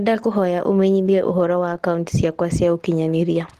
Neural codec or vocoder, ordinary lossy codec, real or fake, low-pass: none; Opus, 16 kbps; real; 14.4 kHz